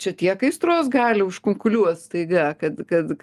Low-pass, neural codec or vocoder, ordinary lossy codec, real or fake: 14.4 kHz; none; Opus, 32 kbps; real